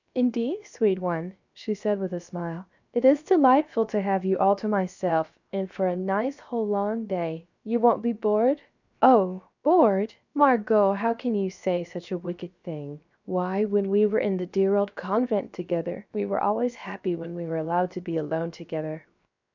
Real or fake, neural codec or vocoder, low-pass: fake; codec, 16 kHz, 0.7 kbps, FocalCodec; 7.2 kHz